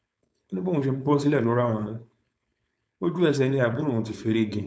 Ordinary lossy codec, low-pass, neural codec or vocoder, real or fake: none; none; codec, 16 kHz, 4.8 kbps, FACodec; fake